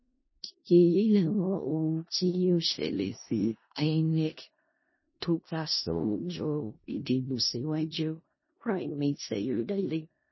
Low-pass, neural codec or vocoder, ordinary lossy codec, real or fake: 7.2 kHz; codec, 16 kHz in and 24 kHz out, 0.4 kbps, LongCat-Audio-Codec, four codebook decoder; MP3, 24 kbps; fake